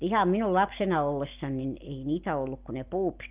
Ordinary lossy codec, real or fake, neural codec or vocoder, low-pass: Opus, 32 kbps; real; none; 3.6 kHz